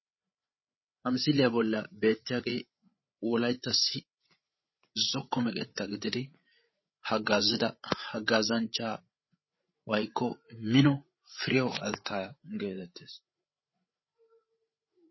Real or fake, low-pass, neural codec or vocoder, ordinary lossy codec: fake; 7.2 kHz; codec, 16 kHz, 8 kbps, FreqCodec, larger model; MP3, 24 kbps